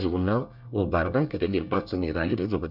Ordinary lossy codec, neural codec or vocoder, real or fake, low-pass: none; codec, 24 kHz, 1 kbps, SNAC; fake; 5.4 kHz